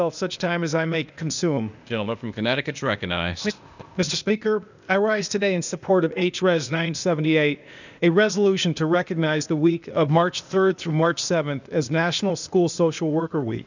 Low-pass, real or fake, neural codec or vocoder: 7.2 kHz; fake; codec, 16 kHz, 0.8 kbps, ZipCodec